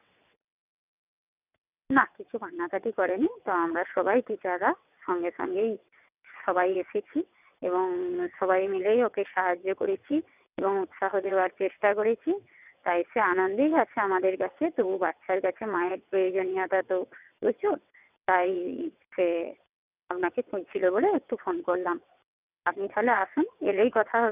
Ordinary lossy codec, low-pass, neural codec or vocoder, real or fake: none; 3.6 kHz; vocoder, 22.05 kHz, 80 mel bands, WaveNeXt; fake